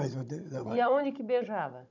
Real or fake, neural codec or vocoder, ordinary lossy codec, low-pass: fake; codec, 16 kHz, 16 kbps, FunCodec, trained on Chinese and English, 50 frames a second; none; 7.2 kHz